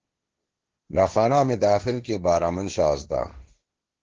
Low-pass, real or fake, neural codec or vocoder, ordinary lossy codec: 7.2 kHz; fake; codec, 16 kHz, 1.1 kbps, Voila-Tokenizer; Opus, 16 kbps